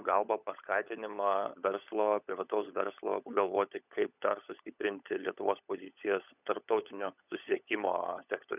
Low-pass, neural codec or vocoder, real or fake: 3.6 kHz; codec, 16 kHz, 16 kbps, FunCodec, trained on LibriTTS, 50 frames a second; fake